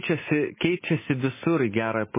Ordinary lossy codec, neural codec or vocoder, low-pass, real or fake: MP3, 16 kbps; none; 3.6 kHz; real